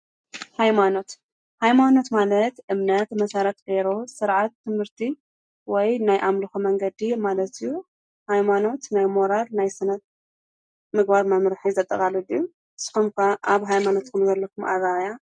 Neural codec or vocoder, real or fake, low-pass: none; real; 9.9 kHz